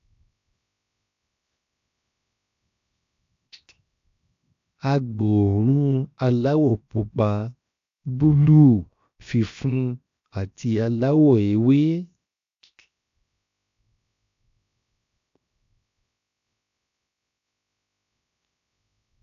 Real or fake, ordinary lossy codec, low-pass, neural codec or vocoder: fake; AAC, 64 kbps; 7.2 kHz; codec, 16 kHz, 0.7 kbps, FocalCodec